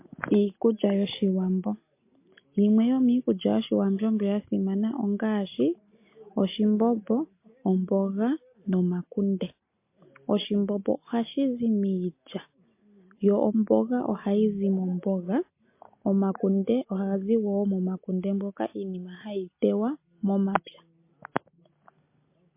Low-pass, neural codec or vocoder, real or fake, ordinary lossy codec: 3.6 kHz; none; real; MP3, 24 kbps